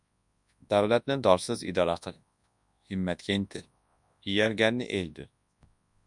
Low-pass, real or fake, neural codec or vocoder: 10.8 kHz; fake; codec, 24 kHz, 0.9 kbps, WavTokenizer, large speech release